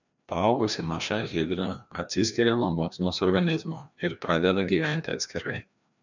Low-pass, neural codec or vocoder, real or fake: 7.2 kHz; codec, 16 kHz, 1 kbps, FreqCodec, larger model; fake